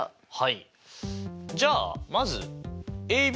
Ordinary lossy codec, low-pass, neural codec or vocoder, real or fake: none; none; none; real